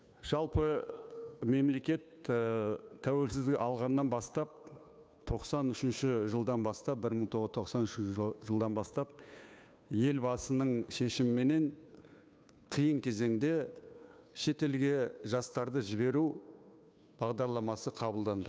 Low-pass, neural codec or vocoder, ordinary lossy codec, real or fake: none; codec, 16 kHz, 2 kbps, FunCodec, trained on Chinese and English, 25 frames a second; none; fake